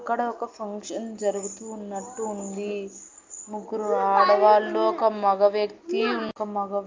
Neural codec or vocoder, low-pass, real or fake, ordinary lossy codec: none; 7.2 kHz; real; Opus, 24 kbps